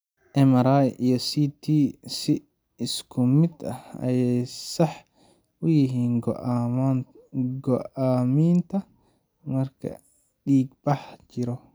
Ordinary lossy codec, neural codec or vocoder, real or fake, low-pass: none; none; real; none